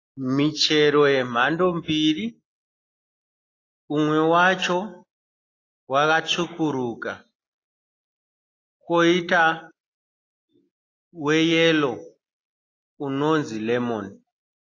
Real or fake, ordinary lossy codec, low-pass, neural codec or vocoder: real; AAC, 32 kbps; 7.2 kHz; none